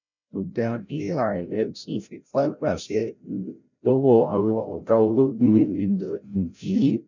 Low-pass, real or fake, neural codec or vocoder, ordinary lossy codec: 7.2 kHz; fake; codec, 16 kHz, 0.5 kbps, FreqCodec, larger model; none